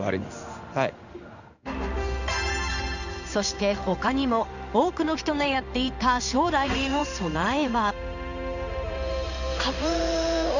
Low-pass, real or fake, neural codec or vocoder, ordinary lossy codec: 7.2 kHz; fake; codec, 16 kHz in and 24 kHz out, 1 kbps, XY-Tokenizer; none